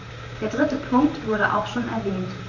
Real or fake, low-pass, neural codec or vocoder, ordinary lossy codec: fake; 7.2 kHz; vocoder, 44.1 kHz, 128 mel bands, Pupu-Vocoder; none